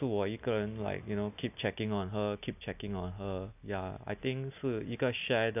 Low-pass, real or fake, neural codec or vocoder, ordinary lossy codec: 3.6 kHz; real; none; none